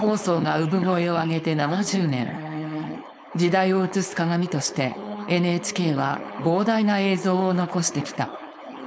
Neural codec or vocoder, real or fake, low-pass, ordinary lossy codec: codec, 16 kHz, 4.8 kbps, FACodec; fake; none; none